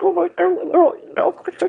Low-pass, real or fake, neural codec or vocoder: 9.9 kHz; fake; autoencoder, 22.05 kHz, a latent of 192 numbers a frame, VITS, trained on one speaker